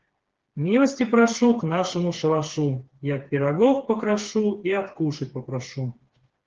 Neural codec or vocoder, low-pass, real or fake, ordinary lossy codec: codec, 16 kHz, 4 kbps, FreqCodec, smaller model; 7.2 kHz; fake; Opus, 16 kbps